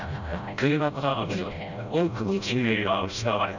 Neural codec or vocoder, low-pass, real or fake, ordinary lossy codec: codec, 16 kHz, 0.5 kbps, FreqCodec, smaller model; 7.2 kHz; fake; none